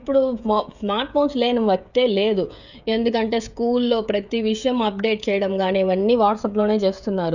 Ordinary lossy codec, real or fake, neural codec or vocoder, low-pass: none; fake; codec, 16 kHz, 16 kbps, FreqCodec, smaller model; 7.2 kHz